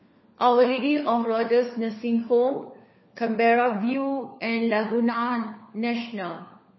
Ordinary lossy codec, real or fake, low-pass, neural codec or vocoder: MP3, 24 kbps; fake; 7.2 kHz; codec, 16 kHz, 4 kbps, FunCodec, trained on LibriTTS, 50 frames a second